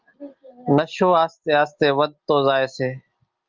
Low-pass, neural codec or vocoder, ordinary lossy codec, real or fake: 7.2 kHz; none; Opus, 24 kbps; real